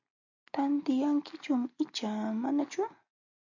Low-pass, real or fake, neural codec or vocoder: 7.2 kHz; real; none